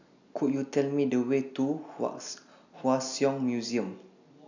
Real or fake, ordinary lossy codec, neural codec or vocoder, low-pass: real; none; none; 7.2 kHz